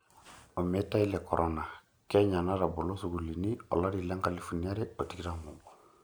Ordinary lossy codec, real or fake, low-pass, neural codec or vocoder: none; real; none; none